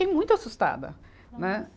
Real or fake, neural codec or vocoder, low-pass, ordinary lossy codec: real; none; none; none